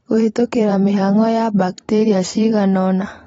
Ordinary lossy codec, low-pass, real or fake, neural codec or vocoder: AAC, 24 kbps; 19.8 kHz; fake; vocoder, 44.1 kHz, 128 mel bands every 512 samples, BigVGAN v2